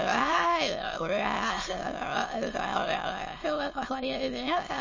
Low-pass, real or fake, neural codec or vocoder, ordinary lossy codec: 7.2 kHz; fake; autoencoder, 22.05 kHz, a latent of 192 numbers a frame, VITS, trained on many speakers; MP3, 32 kbps